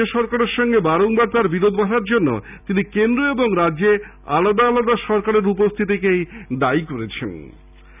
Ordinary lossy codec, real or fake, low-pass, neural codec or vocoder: none; real; 3.6 kHz; none